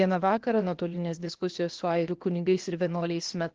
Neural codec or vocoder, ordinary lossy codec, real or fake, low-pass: codec, 16 kHz, 0.8 kbps, ZipCodec; Opus, 16 kbps; fake; 7.2 kHz